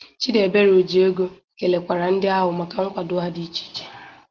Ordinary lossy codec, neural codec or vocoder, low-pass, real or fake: Opus, 16 kbps; none; 7.2 kHz; real